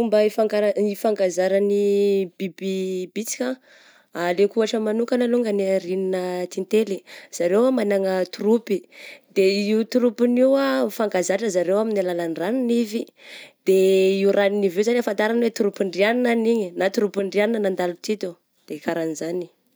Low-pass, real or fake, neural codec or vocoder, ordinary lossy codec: none; real; none; none